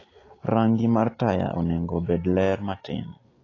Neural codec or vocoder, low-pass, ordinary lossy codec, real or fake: none; 7.2 kHz; AAC, 32 kbps; real